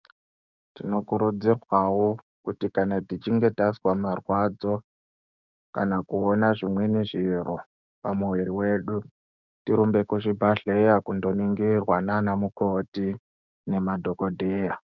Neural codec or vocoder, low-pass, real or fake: codec, 16 kHz, 6 kbps, DAC; 7.2 kHz; fake